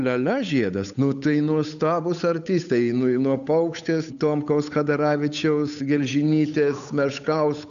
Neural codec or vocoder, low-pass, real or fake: codec, 16 kHz, 8 kbps, FunCodec, trained on Chinese and English, 25 frames a second; 7.2 kHz; fake